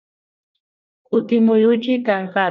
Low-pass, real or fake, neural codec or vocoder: 7.2 kHz; fake; codec, 24 kHz, 1 kbps, SNAC